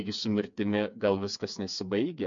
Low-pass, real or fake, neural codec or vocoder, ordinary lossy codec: 7.2 kHz; fake; codec, 16 kHz, 4 kbps, FreqCodec, smaller model; MP3, 48 kbps